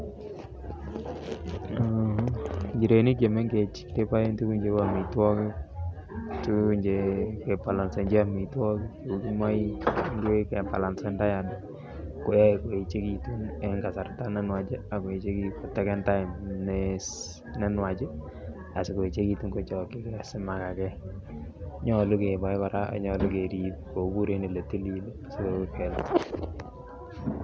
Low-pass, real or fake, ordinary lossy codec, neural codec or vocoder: none; real; none; none